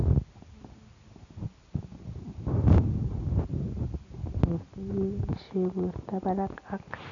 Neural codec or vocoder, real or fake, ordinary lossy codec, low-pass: none; real; none; 7.2 kHz